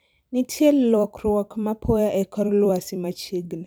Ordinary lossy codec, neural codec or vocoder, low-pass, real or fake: none; vocoder, 44.1 kHz, 128 mel bands every 256 samples, BigVGAN v2; none; fake